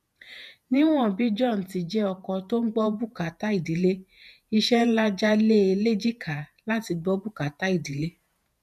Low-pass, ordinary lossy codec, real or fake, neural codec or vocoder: 14.4 kHz; none; fake; vocoder, 48 kHz, 128 mel bands, Vocos